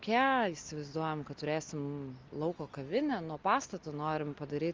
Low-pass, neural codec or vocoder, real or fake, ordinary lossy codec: 7.2 kHz; none; real; Opus, 32 kbps